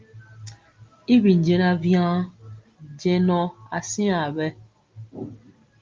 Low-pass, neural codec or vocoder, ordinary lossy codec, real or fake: 7.2 kHz; none; Opus, 32 kbps; real